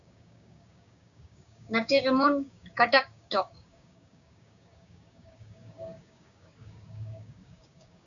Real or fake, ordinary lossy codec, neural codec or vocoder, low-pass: fake; MP3, 96 kbps; codec, 16 kHz, 6 kbps, DAC; 7.2 kHz